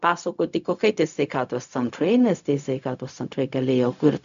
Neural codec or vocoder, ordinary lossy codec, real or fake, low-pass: codec, 16 kHz, 0.4 kbps, LongCat-Audio-Codec; AAC, 96 kbps; fake; 7.2 kHz